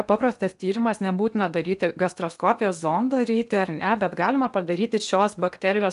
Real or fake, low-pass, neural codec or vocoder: fake; 10.8 kHz; codec, 16 kHz in and 24 kHz out, 0.8 kbps, FocalCodec, streaming, 65536 codes